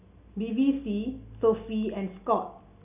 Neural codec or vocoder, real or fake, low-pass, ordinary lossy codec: none; real; 3.6 kHz; none